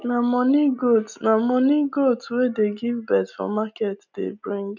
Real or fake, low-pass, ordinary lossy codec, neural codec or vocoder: real; 7.2 kHz; none; none